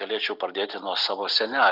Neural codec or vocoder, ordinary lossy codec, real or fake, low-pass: none; Opus, 64 kbps; real; 5.4 kHz